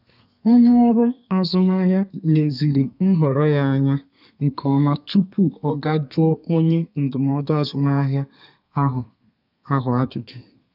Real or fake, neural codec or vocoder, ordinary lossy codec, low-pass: fake; codec, 32 kHz, 1.9 kbps, SNAC; none; 5.4 kHz